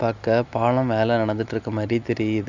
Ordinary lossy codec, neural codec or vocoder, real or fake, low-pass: none; none; real; 7.2 kHz